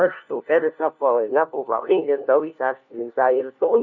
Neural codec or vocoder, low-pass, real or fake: codec, 16 kHz, 1 kbps, FunCodec, trained on LibriTTS, 50 frames a second; 7.2 kHz; fake